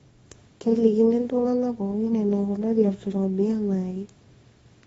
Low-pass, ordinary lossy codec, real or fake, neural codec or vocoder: 10.8 kHz; AAC, 24 kbps; fake; codec, 24 kHz, 0.9 kbps, WavTokenizer, small release